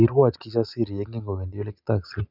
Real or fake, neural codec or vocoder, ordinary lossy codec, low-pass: real; none; none; 5.4 kHz